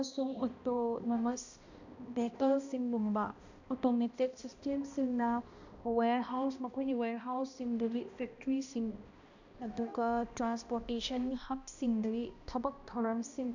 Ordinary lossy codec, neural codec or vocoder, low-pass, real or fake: none; codec, 16 kHz, 1 kbps, X-Codec, HuBERT features, trained on balanced general audio; 7.2 kHz; fake